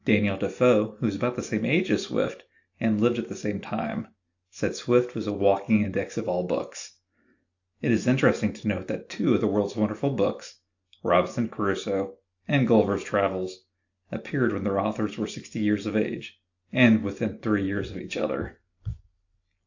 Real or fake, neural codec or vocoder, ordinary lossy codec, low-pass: real; none; AAC, 48 kbps; 7.2 kHz